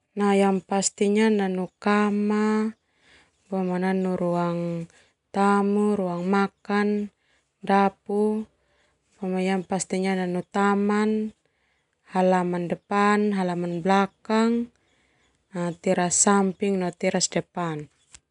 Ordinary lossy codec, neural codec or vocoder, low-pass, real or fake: none; none; 10.8 kHz; real